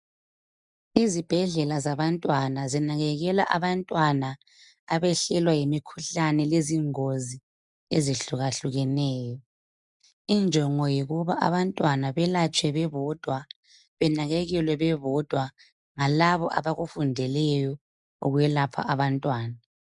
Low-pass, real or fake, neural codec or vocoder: 10.8 kHz; real; none